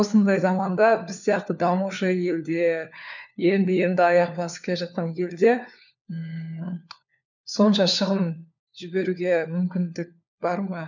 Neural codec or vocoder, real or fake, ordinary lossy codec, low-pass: codec, 16 kHz, 4 kbps, FunCodec, trained on LibriTTS, 50 frames a second; fake; none; 7.2 kHz